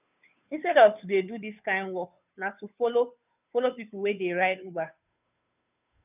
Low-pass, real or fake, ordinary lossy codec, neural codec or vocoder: 3.6 kHz; fake; none; codec, 16 kHz, 2 kbps, FunCodec, trained on Chinese and English, 25 frames a second